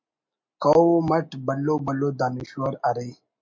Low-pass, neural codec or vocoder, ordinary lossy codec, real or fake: 7.2 kHz; none; MP3, 48 kbps; real